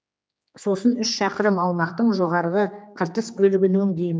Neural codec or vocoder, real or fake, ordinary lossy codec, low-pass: codec, 16 kHz, 2 kbps, X-Codec, HuBERT features, trained on general audio; fake; none; none